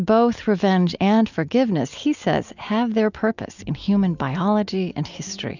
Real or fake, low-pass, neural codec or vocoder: real; 7.2 kHz; none